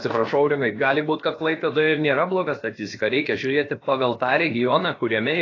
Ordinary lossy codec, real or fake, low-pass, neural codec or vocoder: AAC, 32 kbps; fake; 7.2 kHz; codec, 16 kHz, about 1 kbps, DyCAST, with the encoder's durations